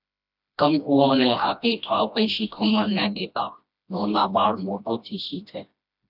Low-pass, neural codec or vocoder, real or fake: 5.4 kHz; codec, 16 kHz, 1 kbps, FreqCodec, smaller model; fake